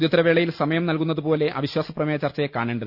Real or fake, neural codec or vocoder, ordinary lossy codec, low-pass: real; none; Opus, 64 kbps; 5.4 kHz